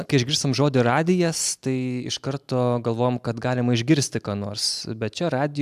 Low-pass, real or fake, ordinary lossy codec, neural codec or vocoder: 14.4 kHz; real; AAC, 96 kbps; none